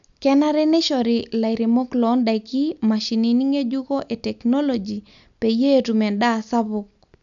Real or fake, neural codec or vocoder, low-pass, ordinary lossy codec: real; none; 7.2 kHz; none